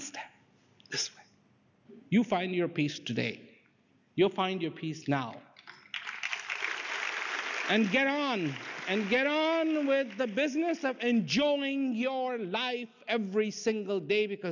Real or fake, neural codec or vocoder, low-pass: real; none; 7.2 kHz